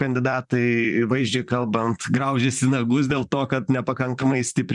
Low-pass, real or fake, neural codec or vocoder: 10.8 kHz; fake; vocoder, 44.1 kHz, 128 mel bands, Pupu-Vocoder